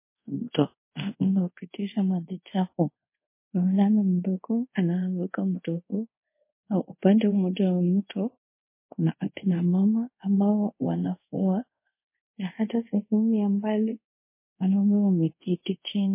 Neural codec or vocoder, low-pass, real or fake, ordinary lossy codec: codec, 24 kHz, 0.5 kbps, DualCodec; 3.6 kHz; fake; MP3, 24 kbps